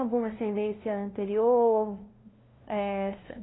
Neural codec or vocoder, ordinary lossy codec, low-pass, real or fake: codec, 16 kHz, 0.5 kbps, FunCodec, trained on LibriTTS, 25 frames a second; AAC, 16 kbps; 7.2 kHz; fake